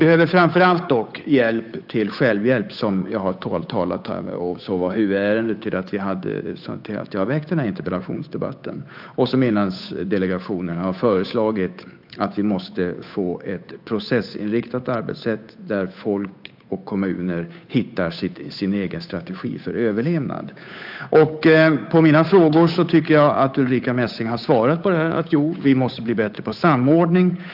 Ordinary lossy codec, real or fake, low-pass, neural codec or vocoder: AAC, 48 kbps; fake; 5.4 kHz; codec, 16 kHz, 8 kbps, FunCodec, trained on Chinese and English, 25 frames a second